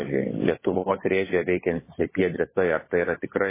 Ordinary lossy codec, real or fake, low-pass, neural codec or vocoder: MP3, 16 kbps; fake; 3.6 kHz; codec, 16 kHz, 16 kbps, FunCodec, trained on LibriTTS, 50 frames a second